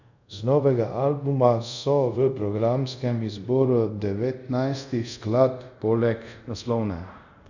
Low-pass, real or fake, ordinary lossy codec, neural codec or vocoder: 7.2 kHz; fake; none; codec, 24 kHz, 0.5 kbps, DualCodec